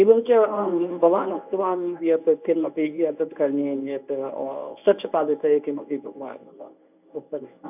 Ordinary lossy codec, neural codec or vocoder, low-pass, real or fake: none; codec, 24 kHz, 0.9 kbps, WavTokenizer, medium speech release version 1; 3.6 kHz; fake